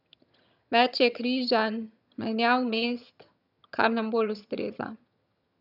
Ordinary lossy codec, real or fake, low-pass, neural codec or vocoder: none; fake; 5.4 kHz; vocoder, 22.05 kHz, 80 mel bands, HiFi-GAN